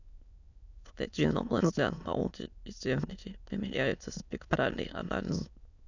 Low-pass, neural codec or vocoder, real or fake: 7.2 kHz; autoencoder, 22.05 kHz, a latent of 192 numbers a frame, VITS, trained on many speakers; fake